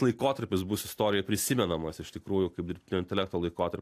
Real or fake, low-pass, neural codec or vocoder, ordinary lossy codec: real; 14.4 kHz; none; AAC, 64 kbps